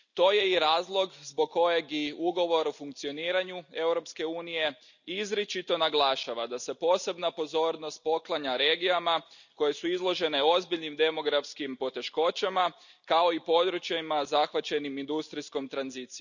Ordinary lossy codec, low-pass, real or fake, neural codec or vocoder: none; 7.2 kHz; real; none